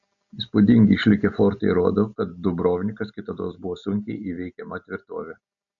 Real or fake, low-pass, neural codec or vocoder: real; 7.2 kHz; none